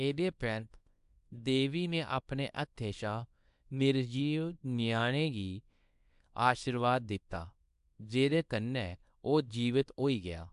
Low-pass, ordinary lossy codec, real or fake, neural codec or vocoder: 10.8 kHz; AAC, 96 kbps; fake; codec, 24 kHz, 0.9 kbps, WavTokenizer, small release